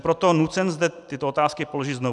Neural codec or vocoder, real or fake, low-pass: none; real; 10.8 kHz